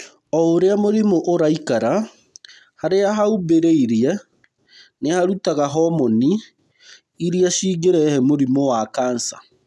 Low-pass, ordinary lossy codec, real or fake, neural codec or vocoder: none; none; real; none